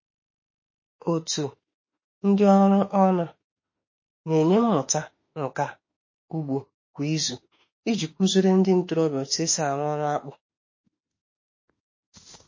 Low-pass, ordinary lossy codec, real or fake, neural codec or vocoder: 7.2 kHz; MP3, 32 kbps; fake; autoencoder, 48 kHz, 32 numbers a frame, DAC-VAE, trained on Japanese speech